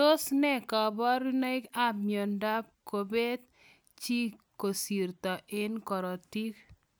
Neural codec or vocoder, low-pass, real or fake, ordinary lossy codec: none; none; real; none